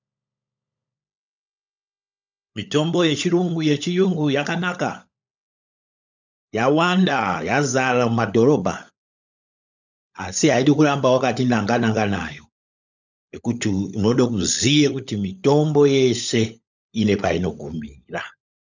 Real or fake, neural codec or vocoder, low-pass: fake; codec, 16 kHz, 16 kbps, FunCodec, trained on LibriTTS, 50 frames a second; 7.2 kHz